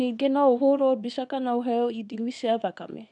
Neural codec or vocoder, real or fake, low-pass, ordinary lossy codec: codec, 24 kHz, 0.9 kbps, WavTokenizer, small release; fake; 10.8 kHz; none